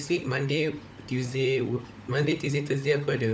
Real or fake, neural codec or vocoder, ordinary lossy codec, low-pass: fake; codec, 16 kHz, 16 kbps, FunCodec, trained on LibriTTS, 50 frames a second; none; none